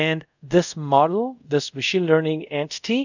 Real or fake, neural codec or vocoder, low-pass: fake; codec, 24 kHz, 0.5 kbps, DualCodec; 7.2 kHz